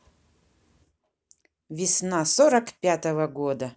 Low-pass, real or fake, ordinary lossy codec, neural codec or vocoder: none; real; none; none